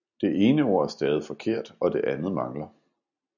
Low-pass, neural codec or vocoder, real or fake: 7.2 kHz; none; real